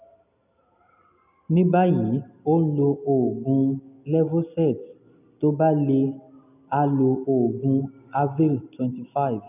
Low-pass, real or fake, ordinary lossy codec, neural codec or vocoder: 3.6 kHz; real; none; none